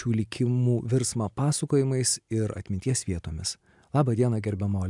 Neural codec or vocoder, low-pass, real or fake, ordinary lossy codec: none; 10.8 kHz; real; MP3, 96 kbps